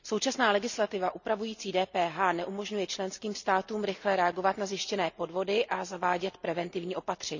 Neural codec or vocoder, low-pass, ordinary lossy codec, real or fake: none; 7.2 kHz; none; real